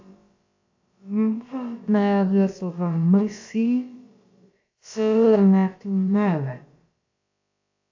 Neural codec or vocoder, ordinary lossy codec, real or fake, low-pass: codec, 16 kHz, about 1 kbps, DyCAST, with the encoder's durations; AAC, 48 kbps; fake; 7.2 kHz